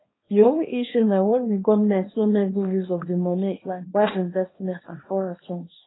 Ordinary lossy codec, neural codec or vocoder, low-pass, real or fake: AAC, 16 kbps; codec, 24 kHz, 0.9 kbps, WavTokenizer, small release; 7.2 kHz; fake